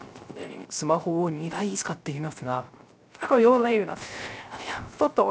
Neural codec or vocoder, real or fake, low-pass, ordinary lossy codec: codec, 16 kHz, 0.3 kbps, FocalCodec; fake; none; none